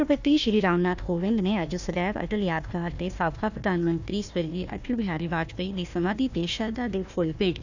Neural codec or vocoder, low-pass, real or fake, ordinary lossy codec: codec, 16 kHz, 1 kbps, FunCodec, trained on Chinese and English, 50 frames a second; 7.2 kHz; fake; none